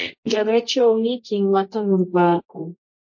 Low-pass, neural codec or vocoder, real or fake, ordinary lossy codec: 7.2 kHz; codec, 24 kHz, 0.9 kbps, WavTokenizer, medium music audio release; fake; MP3, 32 kbps